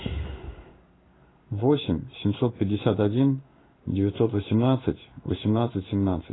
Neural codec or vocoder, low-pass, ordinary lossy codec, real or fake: codec, 16 kHz in and 24 kHz out, 1 kbps, XY-Tokenizer; 7.2 kHz; AAC, 16 kbps; fake